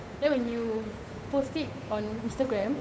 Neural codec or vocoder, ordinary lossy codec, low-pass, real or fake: codec, 16 kHz, 8 kbps, FunCodec, trained on Chinese and English, 25 frames a second; none; none; fake